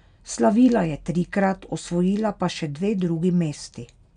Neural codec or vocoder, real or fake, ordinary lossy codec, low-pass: none; real; none; 9.9 kHz